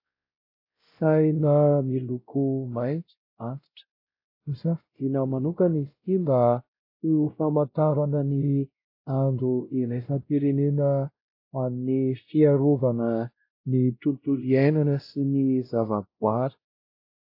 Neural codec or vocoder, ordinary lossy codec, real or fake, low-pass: codec, 16 kHz, 0.5 kbps, X-Codec, WavLM features, trained on Multilingual LibriSpeech; AAC, 32 kbps; fake; 5.4 kHz